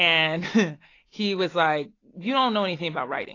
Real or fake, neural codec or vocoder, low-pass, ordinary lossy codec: real; none; 7.2 kHz; AAC, 32 kbps